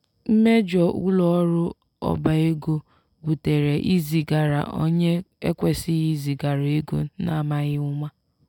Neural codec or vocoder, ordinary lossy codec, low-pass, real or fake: none; none; 19.8 kHz; real